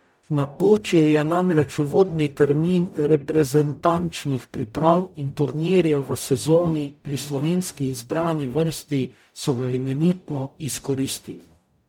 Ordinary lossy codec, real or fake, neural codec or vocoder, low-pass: MP3, 96 kbps; fake; codec, 44.1 kHz, 0.9 kbps, DAC; 19.8 kHz